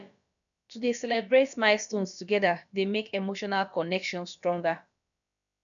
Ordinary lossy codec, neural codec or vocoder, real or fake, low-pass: none; codec, 16 kHz, about 1 kbps, DyCAST, with the encoder's durations; fake; 7.2 kHz